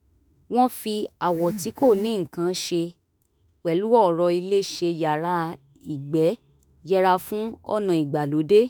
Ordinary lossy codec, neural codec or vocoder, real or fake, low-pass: none; autoencoder, 48 kHz, 32 numbers a frame, DAC-VAE, trained on Japanese speech; fake; none